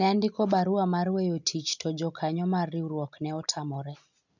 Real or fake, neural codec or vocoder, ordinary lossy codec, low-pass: real; none; none; 7.2 kHz